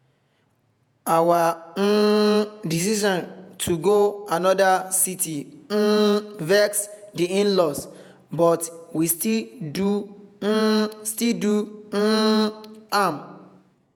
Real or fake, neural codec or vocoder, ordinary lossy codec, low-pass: fake; vocoder, 48 kHz, 128 mel bands, Vocos; none; none